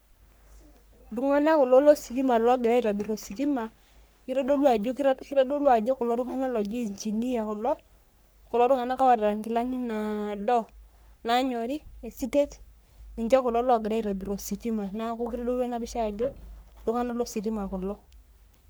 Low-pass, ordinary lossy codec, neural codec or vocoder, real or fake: none; none; codec, 44.1 kHz, 3.4 kbps, Pupu-Codec; fake